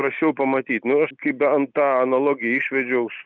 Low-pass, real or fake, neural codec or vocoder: 7.2 kHz; real; none